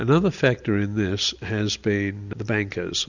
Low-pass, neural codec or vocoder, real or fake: 7.2 kHz; none; real